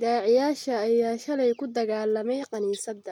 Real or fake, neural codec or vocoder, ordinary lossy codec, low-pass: real; none; none; 19.8 kHz